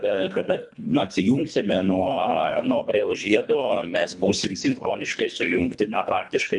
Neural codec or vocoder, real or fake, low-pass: codec, 24 kHz, 1.5 kbps, HILCodec; fake; 10.8 kHz